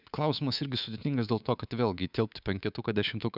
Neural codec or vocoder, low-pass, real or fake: codec, 24 kHz, 3.1 kbps, DualCodec; 5.4 kHz; fake